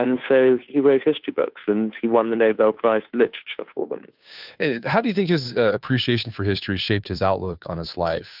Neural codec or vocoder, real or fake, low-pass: codec, 16 kHz, 2 kbps, FunCodec, trained on Chinese and English, 25 frames a second; fake; 5.4 kHz